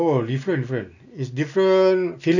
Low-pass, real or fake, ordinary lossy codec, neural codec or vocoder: 7.2 kHz; real; none; none